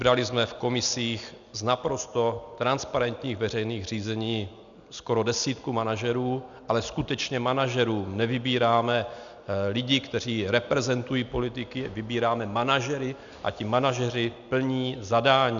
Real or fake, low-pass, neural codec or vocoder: real; 7.2 kHz; none